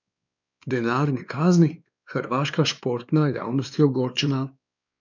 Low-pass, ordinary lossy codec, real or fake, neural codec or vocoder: 7.2 kHz; none; fake; codec, 16 kHz, 2 kbps, X-Codec, WavLM features, trained on Multilingual LibriSpeech